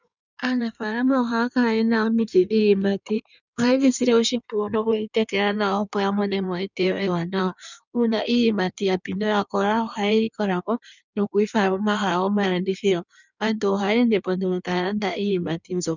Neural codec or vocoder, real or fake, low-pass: codec, 16 kHz in and 24 kHz out, 1.1 kbps, FireRedTTS-2 codec; fake; 7.2 kHz